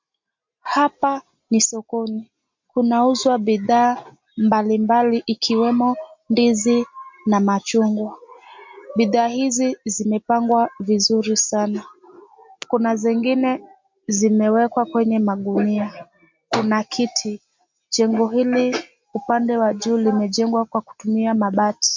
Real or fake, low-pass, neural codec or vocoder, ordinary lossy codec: real; 7.2 kHz; none; MP3, 48 kbps